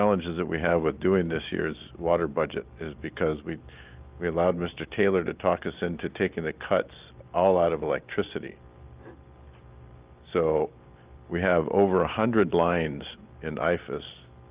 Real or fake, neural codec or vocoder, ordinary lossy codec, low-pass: real; none; Opus, 32 kbps; 3.6 kHz